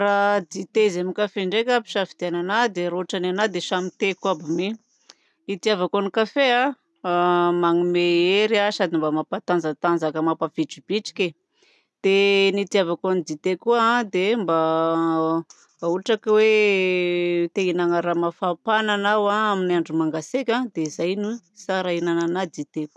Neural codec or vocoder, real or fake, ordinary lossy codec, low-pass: none; real; none; none